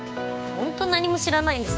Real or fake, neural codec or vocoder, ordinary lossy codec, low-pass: fake; codec, 16 kHz, 6 kbps, DAC; none; none